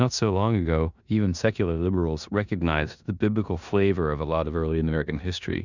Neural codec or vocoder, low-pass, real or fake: codec, 16 kHz in and 24 kHz out, 0.9 kbps, LongCat-Audio-Codec, four codebook decoder; 7.2 kHz; fake